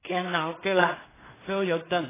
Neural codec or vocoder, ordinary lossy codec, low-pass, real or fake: codec, 16 kHz in and 24 kHz out, 0.4 kbps, LongCat-Audio-Codec, two codebook decoder; AAC, 16 kbps; 3.6 kHz; fake